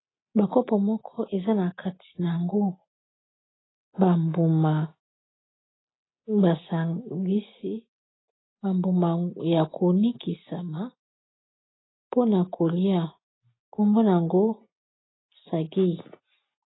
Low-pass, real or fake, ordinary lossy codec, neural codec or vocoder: 7.2 kHz; real; AAC, 16 kbps; none